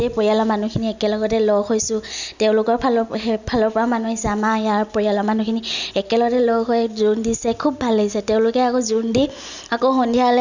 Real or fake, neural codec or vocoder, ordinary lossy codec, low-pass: real; none; none; 7.2 kHz